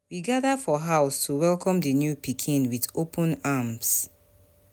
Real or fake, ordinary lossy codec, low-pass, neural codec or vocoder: real; none; none; none